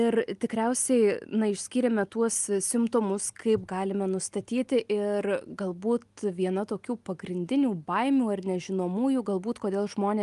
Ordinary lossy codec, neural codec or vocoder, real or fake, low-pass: Opus, 32 kbps; none; real; 10.8 kHz